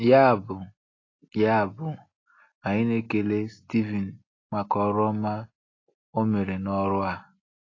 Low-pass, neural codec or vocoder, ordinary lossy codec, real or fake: 7.2 kHz; none; AAC, 32 kbps; real